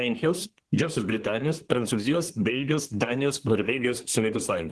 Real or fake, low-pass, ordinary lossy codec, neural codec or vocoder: fake; 10.8 kHz; Opus, 16 kbps; codec, 24 kHz, 1 kbps, SNAC